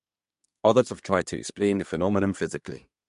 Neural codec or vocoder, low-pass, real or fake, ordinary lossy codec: codec, 24 kHz, 1 kbps, SNAC; 10.8 kHz; fake; MP3, 64 kbps